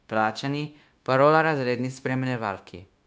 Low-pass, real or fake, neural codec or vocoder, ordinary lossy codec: none; fake; codec, 16 kHz, 0.9 kbps, LongCat-Audio-Codec; none